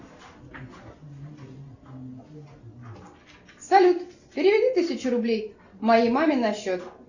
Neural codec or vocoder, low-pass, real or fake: none; 7.2 kHz; real